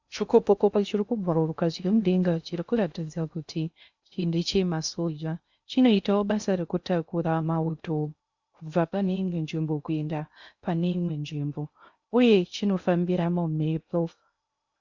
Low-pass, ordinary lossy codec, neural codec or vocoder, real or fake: 7.2 kHz; Opus, 64 kbps; codec, 16 kHz in and 24 kHz out, 0.6 kbps, FocalCodec, streaming, 2048 codes; fake